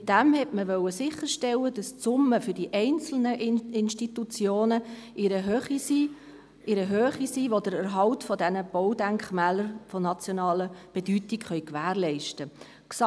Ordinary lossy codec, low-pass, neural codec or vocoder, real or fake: none; none; none; real